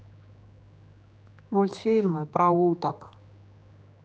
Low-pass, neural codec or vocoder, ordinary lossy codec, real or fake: none; codec, 16 kHz, 2 kbps, X-Codec, HuBERT features, trained on general audio; none; fake